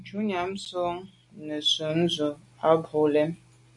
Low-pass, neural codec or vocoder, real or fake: 10.8 kHz; none; real